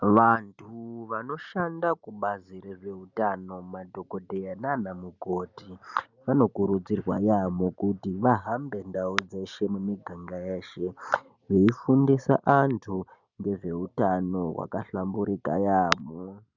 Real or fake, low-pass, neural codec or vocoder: real; 7.2 kHz; none